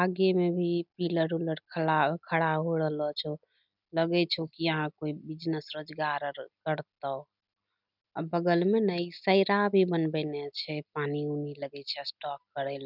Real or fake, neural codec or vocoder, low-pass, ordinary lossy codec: real; none; 5.4 kHz; none